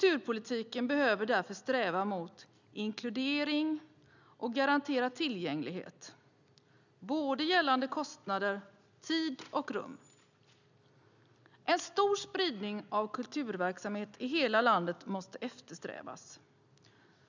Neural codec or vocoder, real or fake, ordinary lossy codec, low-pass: none; real; none; 7.2 kHz